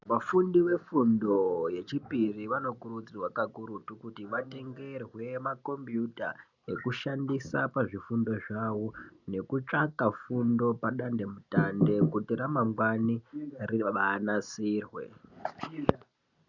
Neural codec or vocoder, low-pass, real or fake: none; 7.2 kHz; real